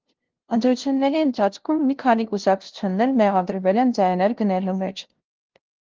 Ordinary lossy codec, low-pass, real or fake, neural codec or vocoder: Opus, 16 kbps; 7.2 kHz; fake; codec, 16 kHz, 0.5 kbps, FunCodec, trained on LibriTTS, 25 frames a second